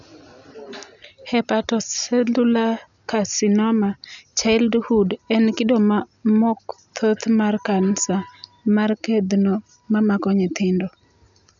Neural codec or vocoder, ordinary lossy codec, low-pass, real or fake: none; none; 7.2 kHz; real